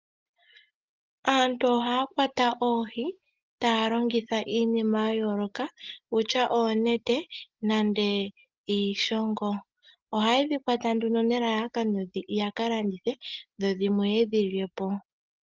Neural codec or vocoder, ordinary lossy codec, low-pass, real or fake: none; Opus, 32 kbps; 7.2 kHz; real